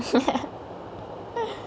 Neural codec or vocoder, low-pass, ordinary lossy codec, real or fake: none; none; none; real